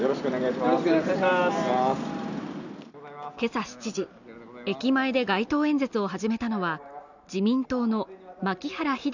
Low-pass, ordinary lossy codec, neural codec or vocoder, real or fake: 7.2 kHz; none; none; real